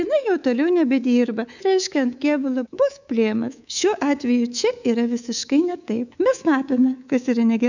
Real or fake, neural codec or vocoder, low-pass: fake; codec, 24 kHz, 3.1 kbps, DualCodec; 7.2 kHz